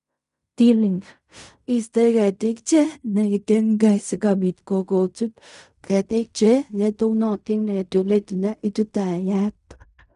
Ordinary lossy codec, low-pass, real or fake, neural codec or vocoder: none; 10.8 kHz; fake; codec, 16 kHz in and 24 kHz out, 0.4 kbps, LongCat-Audio-Codec, fine tuned four codebook decoder